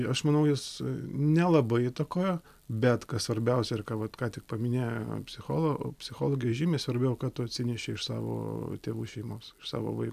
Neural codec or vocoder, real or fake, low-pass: none; real; 14.4 kHz